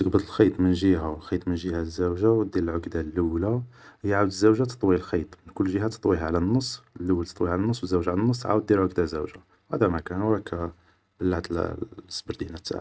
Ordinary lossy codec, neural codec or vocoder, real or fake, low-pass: none; none; real; none